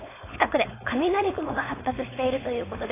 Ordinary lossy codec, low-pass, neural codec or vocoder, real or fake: AAC, 16 kbps; 3.6 kHz; codec, 16 kHz, 4.8 kbps, FACodec; fake